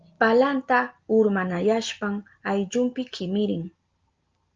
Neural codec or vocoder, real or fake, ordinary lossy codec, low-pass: none; real; Opus, 24 kbps; 7.2 kHz